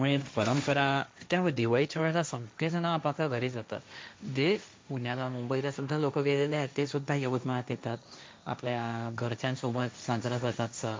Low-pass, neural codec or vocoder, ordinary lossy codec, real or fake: none; codec, 16 kHz, 1.1 kbps, Voila-Tokenizer; none; fake